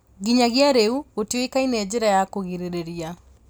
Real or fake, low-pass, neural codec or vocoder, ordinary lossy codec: real; none; none; none